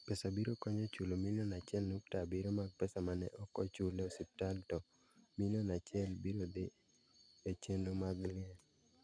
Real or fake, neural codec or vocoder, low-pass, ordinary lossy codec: real; none; 9.9 kHz; none